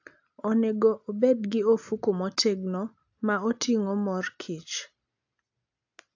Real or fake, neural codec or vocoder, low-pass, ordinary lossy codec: real; none; 7.2 kHz; AAC, 48 kbps